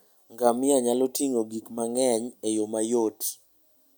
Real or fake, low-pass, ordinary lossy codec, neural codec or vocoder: real; none; none; none